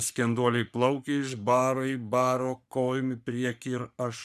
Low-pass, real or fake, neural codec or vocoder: 14.4 kHz; fake; codec, 44.1 kHz, 3.4 kbps, Pupu-Codec